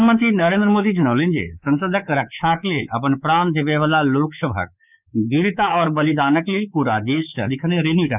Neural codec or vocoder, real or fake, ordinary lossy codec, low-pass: codec, 24 kHz, 3.1 kbps, DualCodec; fake; none; 3.6 kHz